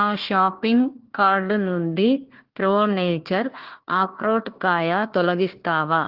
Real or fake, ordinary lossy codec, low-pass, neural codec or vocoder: fake; Opus, 16 kbps; 5.4 kHz; codec, 16 kHz, 1 kbps, FunCodec, trained on Chinese and English, 50 frames a second